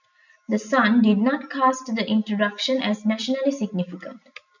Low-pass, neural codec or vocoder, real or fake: 7.2 kHz; none; real